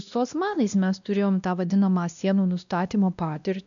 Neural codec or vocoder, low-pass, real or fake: codec, 16 kHz, 1 kbps, X-Codec, WavLM features, trained on Multilingual LibriSpeech; 7.2 kHz; fake